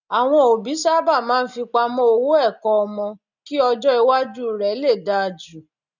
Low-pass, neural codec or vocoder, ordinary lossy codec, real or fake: 7.2 kHz; none; none; real